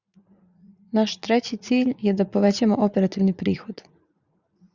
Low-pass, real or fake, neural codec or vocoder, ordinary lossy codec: 7.2 kHz; fake; vocoder, 44.1 kHz, 80 mel bands, Vocos; Opus, 64 kbps